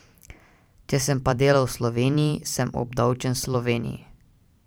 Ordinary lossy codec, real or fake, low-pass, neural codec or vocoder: none; fake; none; vocoder, 44.1 kHz, 128 mel bands every 512 samples, BigVGAN v2